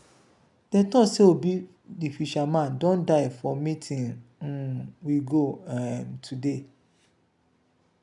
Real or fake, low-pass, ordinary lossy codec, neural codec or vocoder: real; 10.8 kHz; none; none